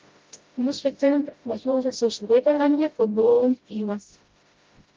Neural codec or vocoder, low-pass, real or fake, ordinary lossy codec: codec, 16 kHz, 0.5 kbps, FreqCodec, smaller model; 7.2 kHz; fake; Opus, 24 kbps